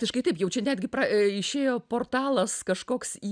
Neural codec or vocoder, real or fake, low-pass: none; real; 9.9 kHz